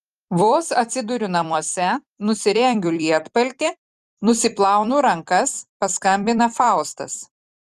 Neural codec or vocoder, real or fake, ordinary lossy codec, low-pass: vocoder, 44.1 kHz, 128 mel bands every 256 samples, BigVGAN v2; fake; Opus, 32 kbps; 14.4 kHz